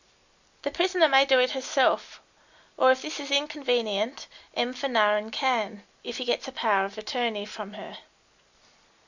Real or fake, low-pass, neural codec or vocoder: real; 7.2 kHz; none